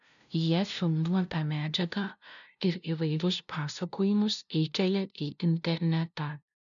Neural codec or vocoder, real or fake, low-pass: codec, 16 kHz, 0.5 kbps, FunCodec, trained on LibriTTS, 25 frames a second; fake; 7.2 kHz